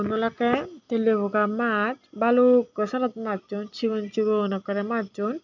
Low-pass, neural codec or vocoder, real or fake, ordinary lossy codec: 7.2 kHz; none; real; none